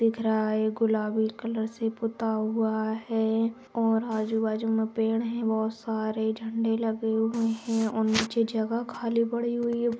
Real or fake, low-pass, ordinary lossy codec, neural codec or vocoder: real; none; none; none